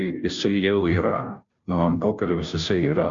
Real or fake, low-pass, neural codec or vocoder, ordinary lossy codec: fake; 7.2 kHz; codec, 16 kHz, 0.5 kbps, FunCodec, trained on Chinese and English, 25 frames a second; MP3, 64 kbps